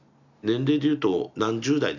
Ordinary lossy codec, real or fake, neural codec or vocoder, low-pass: none; real; none; 7.2 kHz